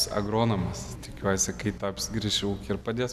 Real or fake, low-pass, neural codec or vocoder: real; 14.4 kHz; none